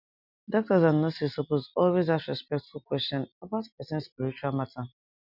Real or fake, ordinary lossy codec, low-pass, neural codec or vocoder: real; none; 5.4 kHz; none